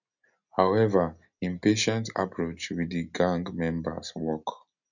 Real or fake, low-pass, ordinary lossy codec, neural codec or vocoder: real; 7.2 kHz; none; none